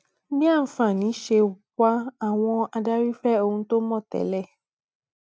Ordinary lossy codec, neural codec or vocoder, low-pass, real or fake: none; none; none; real